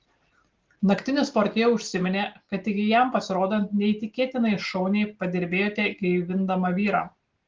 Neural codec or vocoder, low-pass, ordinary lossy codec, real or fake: none; 7.2 kHz; Opus, 16 kbps; real